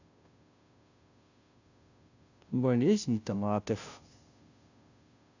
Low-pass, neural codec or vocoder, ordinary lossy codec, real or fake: 7.2 kHz; codec, 16 kHz, 0.5 kbps, FunCodec, trained on Chinese and English, 25 frames a second; none; fake